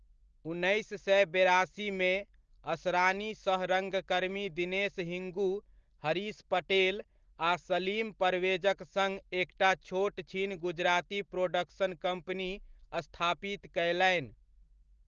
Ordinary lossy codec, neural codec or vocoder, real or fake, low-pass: Opus, 16 kbps; none; real; 7.2 kHz